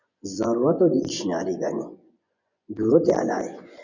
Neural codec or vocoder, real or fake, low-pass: vocoder, 24 kHz, 100 mel bands, Vocos; fake; 7.2 kHz